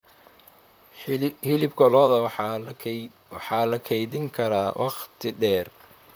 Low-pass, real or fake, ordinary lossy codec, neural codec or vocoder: none; fake; none; vocoder, 44.1 kHz, 128 mel bands, Pupu-Vocoder